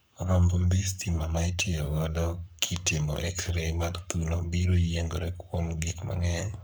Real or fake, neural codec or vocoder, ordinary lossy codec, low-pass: fake; codec, 44.1 kHz, 7.8 kbps, Pupu-Codec; none; none